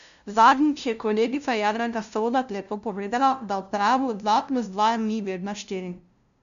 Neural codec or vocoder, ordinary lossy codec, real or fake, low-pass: codec, 16 kHz, 0.5 kbps, FunCodec, trained on LibriTTS, 25 frames a second; MP3, 96 kbps; fake; 7.2 kHz